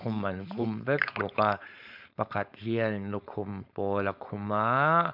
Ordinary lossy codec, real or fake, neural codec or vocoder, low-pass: MP3, 48 kbps; fake; codec, 16 kHz, 4.8 kbps, FACodec; 5.4 kHz